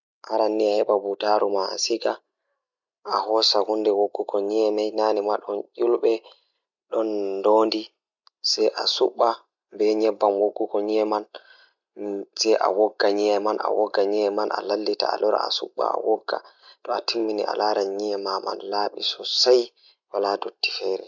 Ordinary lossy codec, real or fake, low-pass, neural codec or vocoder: none; real; 7.2 kHz; none